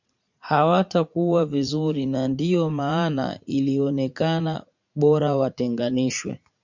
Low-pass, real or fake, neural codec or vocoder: 7.2 kHz; fake; vocoder, 44.1 kHz, 80 mel bands, Vocos